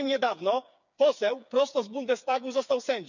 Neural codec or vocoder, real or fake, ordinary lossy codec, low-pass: codec, 16 kHz, 4 kbps, FreqCodec, smaller model; fake; none; 7.2 kHz